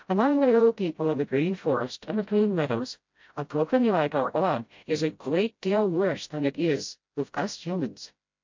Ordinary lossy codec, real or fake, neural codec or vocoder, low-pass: MP3, 48 kbps; fake; codec, 16 kHz, 0.5 kbps, FreqCodec, smaller model; 7.2 kHz